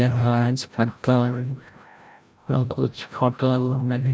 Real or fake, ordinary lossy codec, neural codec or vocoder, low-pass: fake; none; codec, 16 kHz, 0.5 kbps, FreqCodec, larger model; none